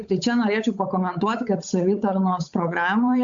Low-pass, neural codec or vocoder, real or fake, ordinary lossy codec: 7.2 kHz; codec, 16 kHz, 8 kbps, FunCodec, trained on Chinese and English, 25 frames a second; fake; AAC, 64 kbps